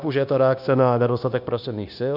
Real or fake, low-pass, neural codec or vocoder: fake; 5.4 kHz; codec, 16 kHz, 0.9 kbps, LongCat-Audio-Codec